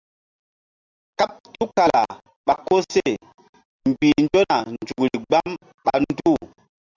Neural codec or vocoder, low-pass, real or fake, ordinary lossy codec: none; 7.2 kHz; real; Opus, 64 kbps